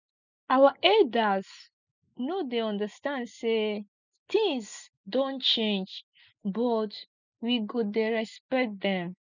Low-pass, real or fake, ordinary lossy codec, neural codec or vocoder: 7.2 kHz; real; none; none